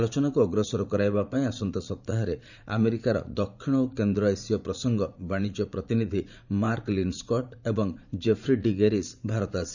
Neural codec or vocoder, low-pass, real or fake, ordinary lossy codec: vocoder, 44.1 kHz, 80 mel bands, Vocos; 7.2 kHz; fake; none